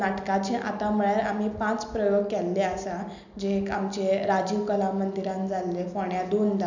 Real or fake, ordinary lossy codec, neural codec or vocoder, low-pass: real; none; none; 7.2 kHz